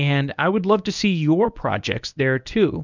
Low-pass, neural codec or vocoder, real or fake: 7.2 kHz; codec, 24 kHz, 0.9 kbps, WavTokenizer, medium speech release version 1; fake